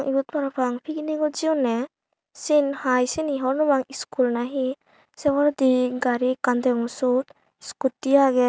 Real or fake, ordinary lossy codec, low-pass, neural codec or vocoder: real; none; none; none